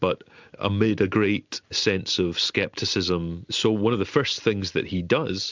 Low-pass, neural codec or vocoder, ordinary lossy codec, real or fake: 7.2 kHz; none; MP3, 64 kbps; real